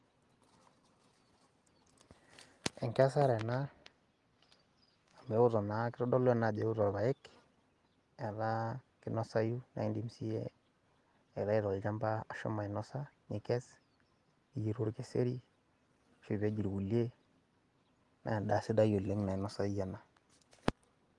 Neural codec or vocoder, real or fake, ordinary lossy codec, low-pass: none; real; Opus, 24 kbps; 10.8 kHz